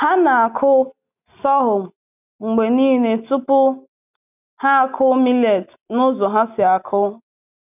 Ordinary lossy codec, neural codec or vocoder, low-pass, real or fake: none; none; 3.6 kHz; real